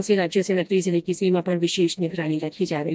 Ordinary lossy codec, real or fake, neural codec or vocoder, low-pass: none; fake; codec, 16 kHz, 1 kbps, FreqCodec, smaller model; none